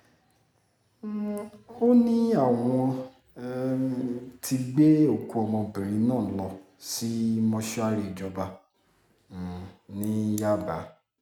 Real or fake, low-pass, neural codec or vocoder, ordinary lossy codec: real; 19.8 kHz; none; none